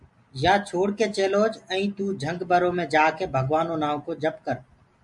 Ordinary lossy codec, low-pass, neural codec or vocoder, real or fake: MP3, 64 kbps; 10.8 kHz; none; real